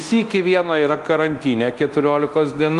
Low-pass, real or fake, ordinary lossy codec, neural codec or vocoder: 10.8 kHz; fake; Opus, 24 kbps; codec, 24 kHz, 0.9 kbps, DualCodec